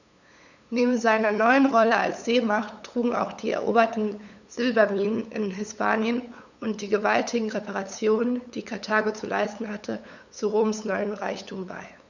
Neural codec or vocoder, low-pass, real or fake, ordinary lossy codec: codec, 16 kHz, 8 kbps, FunCodec, trained on LibriTTS, 25 frames a second; 7.2 kHz; fake; none